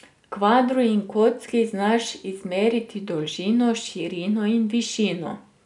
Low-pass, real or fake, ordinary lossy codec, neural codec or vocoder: 10.8 kHz; real; none; none